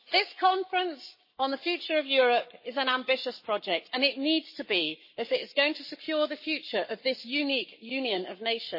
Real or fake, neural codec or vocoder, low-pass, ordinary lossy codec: fake; codec, 44.1 kHz, 7.8 kbps, Pupu-Codec; 5.4 kHz; MP3, 24 kbps